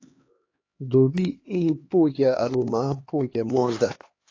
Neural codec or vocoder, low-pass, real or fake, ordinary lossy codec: codec, 16 kHz, 2 kbps, X-Codec, HuBERT features, trained on LibriSpeech; 7.2 kHz; fake; AAC, 32 kbps